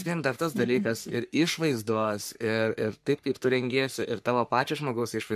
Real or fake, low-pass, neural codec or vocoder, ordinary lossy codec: fake; 14.4 kHz; codec, 44.1 kHz, 3.4 kbps, Pupu-Codec; MP3, 96 kbps